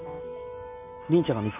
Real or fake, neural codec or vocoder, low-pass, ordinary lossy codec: real; none; 3.6 kHz; none